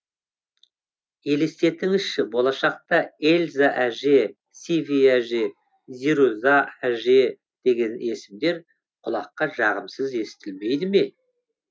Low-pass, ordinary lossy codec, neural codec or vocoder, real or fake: none; none; none; real